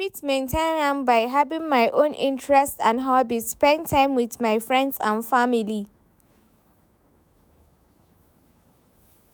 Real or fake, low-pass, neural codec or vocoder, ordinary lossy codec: fake; none; autoencoder, 48 kHz, 128 numbers a frame, DAC-VAE, trained on Japanese speech; none